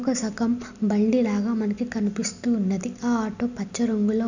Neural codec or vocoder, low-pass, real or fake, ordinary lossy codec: none; 7.2 kHz; real; none